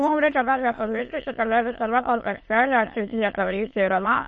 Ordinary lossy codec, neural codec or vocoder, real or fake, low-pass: MP3, 32 kbps; autoencoder, 22.05 kHz, a latent of 192 numbers a frame, VITS, trained on many speakers; fake; 9.9 kHz